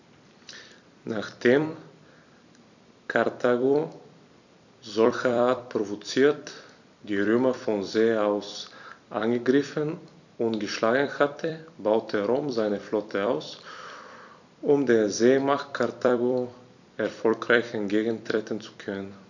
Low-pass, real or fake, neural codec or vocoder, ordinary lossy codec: 7.2 kHz; fake; vocoder, 44.1 kHz, 128 mel bands every 256 samples, BigVGAN v2; none